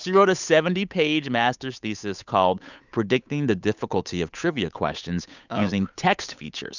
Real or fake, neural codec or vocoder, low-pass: fake; codec, 16 kHz, 8 kbps, FunCodec, trained on Chinese and English, 25 frames a second; 7.2 kHz